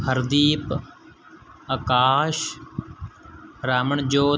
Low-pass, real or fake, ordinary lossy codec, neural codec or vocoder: none; real; none; none